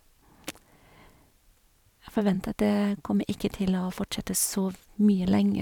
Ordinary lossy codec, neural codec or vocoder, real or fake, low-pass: none; vocoder, 44.1 kHz, 128 mel bands every 512 samples, BigVGAN v2; fake; 19.8 kHz